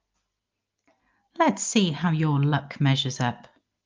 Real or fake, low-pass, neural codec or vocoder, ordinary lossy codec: real; 7.2 kHz; none; Opus, 32 kbps